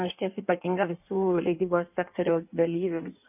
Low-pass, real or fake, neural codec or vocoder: 3.6 kHz; fake; codec, 16 kHz in and 24 kHz out, 1.1 kbps, FireRedTTS-2 codec